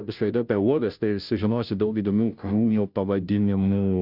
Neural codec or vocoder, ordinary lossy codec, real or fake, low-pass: codec, 16 kHz, 0.5 kbps, FunCodec, trained on Chinese and English, 25 frames a second; Opus, 64 kbps; fake; 5.4 kHz